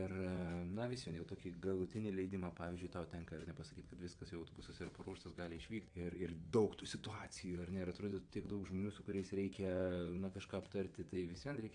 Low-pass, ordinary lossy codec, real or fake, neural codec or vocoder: 9.9 kHz; AAC, 64 kbps; fake; vocoder, 22.05 kHz, 80 mel bands, WaveNeXt